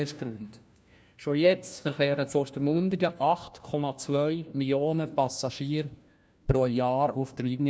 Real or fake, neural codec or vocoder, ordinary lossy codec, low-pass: fake; codec, 16 kHz, 1 kbps, FunCodec, trained on LibriTTS, 50 frames a second; none; none